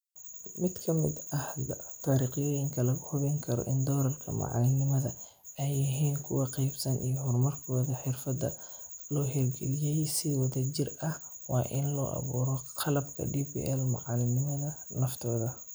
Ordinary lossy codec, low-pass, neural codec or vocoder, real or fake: none; none; none; real